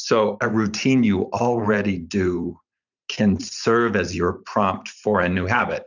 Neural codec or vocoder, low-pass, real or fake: vocoder, 44.1 kHz, 128 mel bands every 512 samples, BigVGAN v2; 7.2 kHz; fake